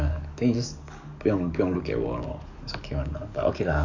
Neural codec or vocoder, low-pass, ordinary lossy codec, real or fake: codec, 44.1 kHz, 7.8 kbps, DAC; 7.2 kHz; none; fake